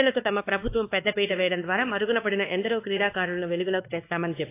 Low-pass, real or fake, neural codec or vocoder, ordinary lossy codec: 3.6 kHz; fake; codec, 16 kHz, 2 kbps, X-Codec, WavLM features, trained on Multilingual LibriSpeech; AAC, 24 kbps